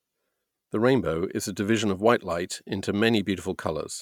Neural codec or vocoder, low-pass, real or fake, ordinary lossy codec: none; 19.8 kHz; real; none